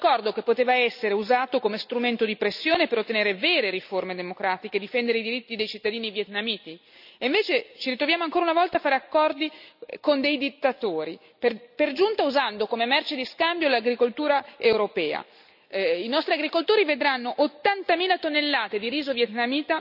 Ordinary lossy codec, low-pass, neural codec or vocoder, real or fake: none; 5.4 kHz; none; real